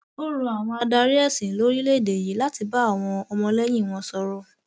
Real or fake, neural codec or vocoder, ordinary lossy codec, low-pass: real; none; none; none